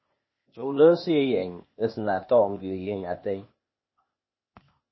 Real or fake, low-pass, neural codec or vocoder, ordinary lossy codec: fake; 7.2 kHz; codec, 16 kHz, 0.8 kbps, ZipCodec; MP3, 24 kbps